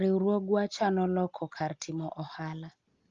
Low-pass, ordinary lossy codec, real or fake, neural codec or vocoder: 7.2 kHz; Opus, 32 kbps; real; none